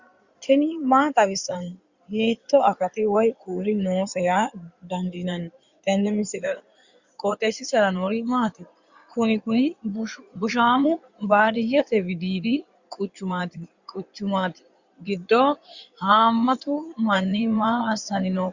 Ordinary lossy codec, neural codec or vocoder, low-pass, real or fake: Opus, 64 kbps; codec, 16 kHz in and 24 kHz out, 2.2 kbps, FireRedTTS-2 codec; 7.2 kHz; fake